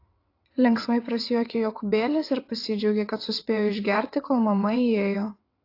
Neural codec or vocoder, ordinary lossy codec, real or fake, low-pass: vocoder, 22.05 kHz, 80 mel bands, WaveNeXt; AAC, 32 kbps; fake; 5.4 kHz